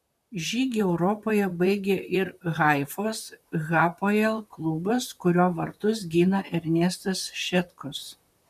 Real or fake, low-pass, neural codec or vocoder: fake; 14.4 kHz; vocoder, 44.1 kHz, 128 mel bands, Pupu-Vocoder